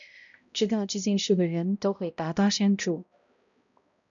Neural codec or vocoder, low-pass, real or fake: codec, 16 kHz, 0.5 kbps, X-Codec, HuBERT features, trained on balanced general audio; 7.2 kHz; fake